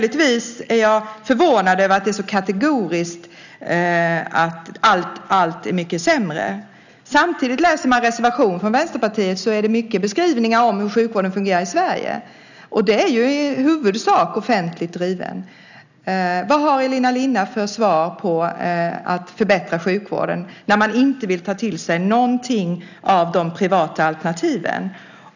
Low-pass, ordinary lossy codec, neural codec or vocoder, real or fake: 7.2 kHz; none; none; real